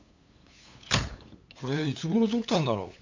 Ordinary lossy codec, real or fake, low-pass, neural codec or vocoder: MP3, 64 kbps; fake; 7.2 kHz; codec, 16 kHz, 8 kbps, FunCodec, trained on LibriTTS, 25 frames a second